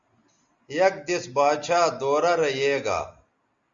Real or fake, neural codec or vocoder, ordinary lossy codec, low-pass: real; none; Opus, 64 kbps; 7.2 kHz